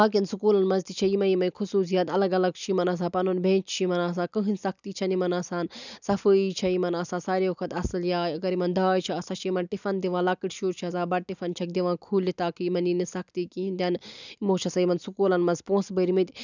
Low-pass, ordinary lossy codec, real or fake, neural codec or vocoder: 7.2 kHz; none; real; none